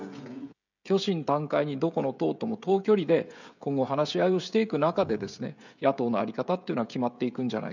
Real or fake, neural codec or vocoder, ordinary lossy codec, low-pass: fake; codec, 16 kHz, 16 kbps, FreqCodec, smaller model; none; 7.2 kHz